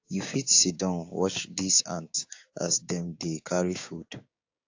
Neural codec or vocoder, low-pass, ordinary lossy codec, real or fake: codec, 16 kHz, 6 kbps, DAC; 7.2 kHz; AAC, 48 kbps; fake